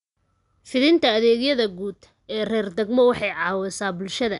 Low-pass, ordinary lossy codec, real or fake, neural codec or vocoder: 10.8 kHz; none; real; none